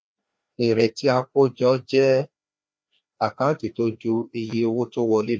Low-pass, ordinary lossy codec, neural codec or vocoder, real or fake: none; none; codec, 16 kHz, 2 kbps, FreqCodec, larger model; fake